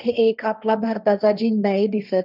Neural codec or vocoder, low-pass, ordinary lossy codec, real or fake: codec, 16 kHz, 1.1 kbps, Voila-Tokenizer; 5.4 kHz; none; fake